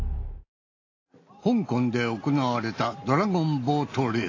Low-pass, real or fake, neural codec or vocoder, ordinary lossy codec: 7.2 kHz; real; none; AAC, 32 kbps